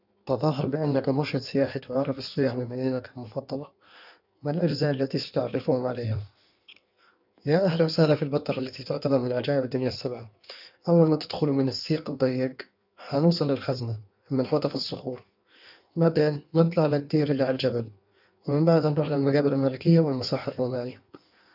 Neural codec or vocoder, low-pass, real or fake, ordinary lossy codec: codec, 16 kHz in and 24 kHz out, 1.1 kbps, FireRedTTS-2 codec; 5.4 kHz; fake; none